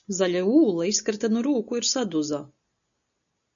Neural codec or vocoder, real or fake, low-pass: none; real; 7.2 kHz